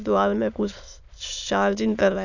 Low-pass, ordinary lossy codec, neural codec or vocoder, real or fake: 7.2 kHz; none; autoencoder, 22.05 kHz, a latent of 192 numbers a frame, VITS, trained on many speakers; fake